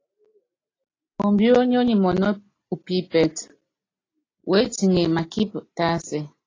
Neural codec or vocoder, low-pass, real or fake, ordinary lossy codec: none; 7.2 kHz; real; AAC, 32 kbps